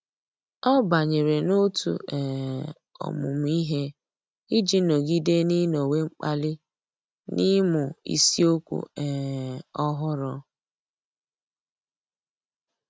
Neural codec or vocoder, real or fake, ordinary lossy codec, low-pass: none; real; none; none